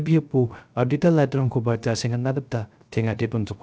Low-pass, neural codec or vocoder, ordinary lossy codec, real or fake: none; codec, 16 kHz, 0.3 kbps, FocalCodec; none; fake